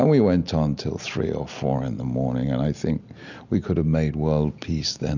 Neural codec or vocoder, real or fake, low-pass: none; real; 7.2 kHz